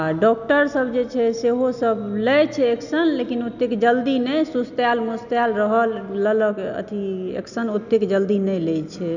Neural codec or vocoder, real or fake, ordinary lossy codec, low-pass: none; real; none; 7.2 kHz